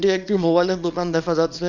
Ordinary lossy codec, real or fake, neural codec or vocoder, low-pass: none; fake; codec, 24 kHz, 0.9 kbps, WavTokenizer, small release; 7.2 kHz